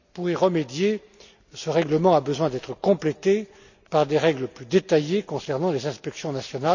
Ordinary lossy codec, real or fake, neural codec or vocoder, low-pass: none; real; none; 7.2 kHz